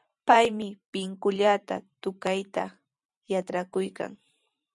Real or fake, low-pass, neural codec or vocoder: fake; 10.8 kHz; vocoder, 44.1 kHz, 128 mel bands every 512 samples, BigVGAN v2